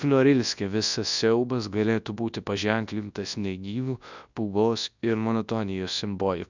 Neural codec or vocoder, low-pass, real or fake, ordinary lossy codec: codec, 24 kHz, 0.9 kbps, WavTokenizer, large speech release; 7.2 kHz; fake; Opus, 64 kbps